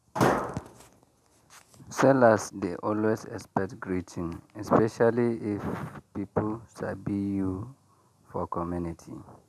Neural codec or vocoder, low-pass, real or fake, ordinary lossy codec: none; 14.4 kHz; real; none